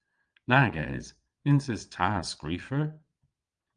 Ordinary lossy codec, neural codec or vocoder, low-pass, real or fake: Opus, 32 kbps; vocoder, 22.05 kHz, 80 mel bands, Vocos; 9.9 kHz; fake